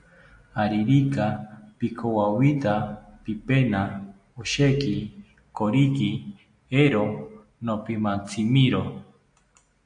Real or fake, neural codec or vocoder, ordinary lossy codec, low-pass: real; none; AAC, 64 kbps; 9.9 kHz